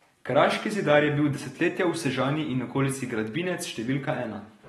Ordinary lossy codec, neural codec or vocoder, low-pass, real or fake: AAC, 32 kbps; none; 19.8 kHz; real